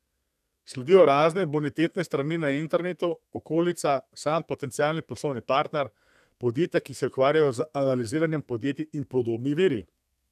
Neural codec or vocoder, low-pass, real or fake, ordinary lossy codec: codec, 32 kHz, 1.9 kbps, SNAC; 14.4 kHz; fake; none